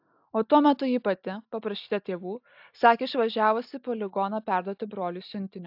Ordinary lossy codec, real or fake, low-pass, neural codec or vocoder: AAC, 48 kbps; real; 5.4 kHz; none